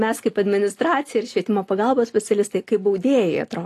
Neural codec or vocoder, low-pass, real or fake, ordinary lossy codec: none; 14.4 kHz; real; AAC, 48 kbps